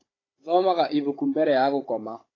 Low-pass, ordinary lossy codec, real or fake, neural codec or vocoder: 7.2 kHz; AAC, 32 kbps; fake; codec, 16 kHz, 16 kbps, FunCodec, trained on Chinese and English, 50 frames a second